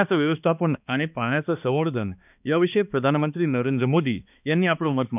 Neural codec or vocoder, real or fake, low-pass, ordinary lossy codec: codec, 16 kHz, 2 kbps, X-Codec, HuBERT features, trained on balanced general audio; fake; 3.6 kHz; none